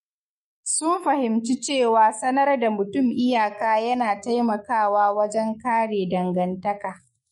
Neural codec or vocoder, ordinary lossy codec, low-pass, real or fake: autoencoder, 48 kHz, 128 numbers a frame, DAC-VAE, trained on Japanese speech; MP3, 48 kbps; 19.8 kHz; fake